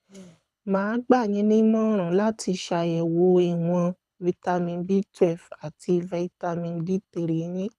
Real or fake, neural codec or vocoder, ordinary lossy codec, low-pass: fake; codec, 24 kHz, 6 kbps, HILCodec; none; none